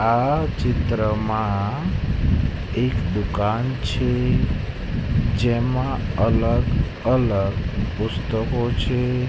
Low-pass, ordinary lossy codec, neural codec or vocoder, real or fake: none; none; none; real